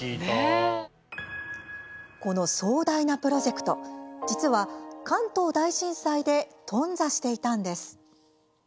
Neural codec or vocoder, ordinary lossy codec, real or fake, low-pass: none; none; real; none